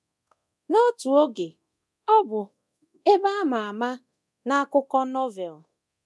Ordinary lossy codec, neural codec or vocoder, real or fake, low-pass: none; codec, 24 kHz, 0.9 kbps, DualCodec; fake; none